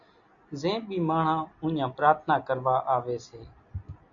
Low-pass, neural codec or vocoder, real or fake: 7.2 kHz; none; real